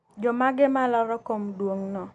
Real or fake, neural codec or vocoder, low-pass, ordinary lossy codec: real; none; 10.8 kHz; none